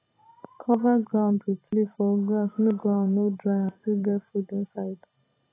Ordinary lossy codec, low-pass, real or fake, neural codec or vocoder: AAC, 16 kbps; 3.6 kHz; fake; vocoder, 44.1 kHz, 128 mel bands every 512 samples, BigVGAN v2